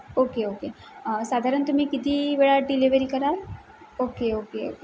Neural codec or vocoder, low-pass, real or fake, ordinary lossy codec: none; none; real; none